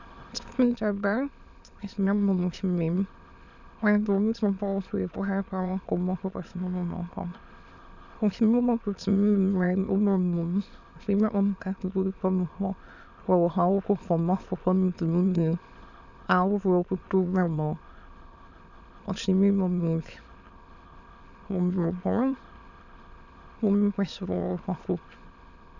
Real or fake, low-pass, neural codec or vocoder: fake; 7.2 kHz; autoencoder, 22.05 kHz, a latent of 192 numbers a frame, VITS, trained on many speakers